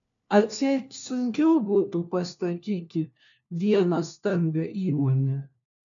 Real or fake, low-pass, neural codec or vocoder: fake; 7.2 kHz; codec, 16 kHz, 1 kbps, FunCodec, trained on LibriTTS, 50 frames a second